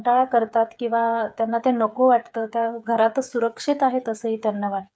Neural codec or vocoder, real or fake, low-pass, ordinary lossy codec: codec, 16 kHz, 8 kbps, FreqCodec, smaller model; fake; none; none